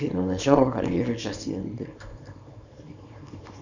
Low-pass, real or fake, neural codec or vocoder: 7.2 kHz; fake; codec, 24 kHz, 0.9 kbps, WavTokenizer, small release